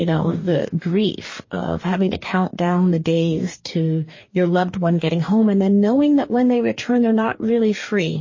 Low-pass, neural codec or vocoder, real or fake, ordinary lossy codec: 7.2 kHz; codec, 44.1 kHz, 2.6 kbps, DAC; fake; MP3, 32 kbps